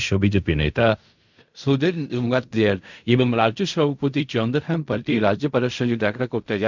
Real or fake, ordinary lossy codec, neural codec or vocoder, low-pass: fake; none; codec, 16 kHz in and 24 kHz out, 0.4 kbps, LongCat-Audio-Codec, fine tuned four codebook decoder; 7.2 kHz